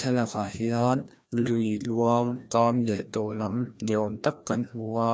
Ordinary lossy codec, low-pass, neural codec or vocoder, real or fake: none; none; codec, 16 kHz, 1 kbps, FreqCodec, larger model; fake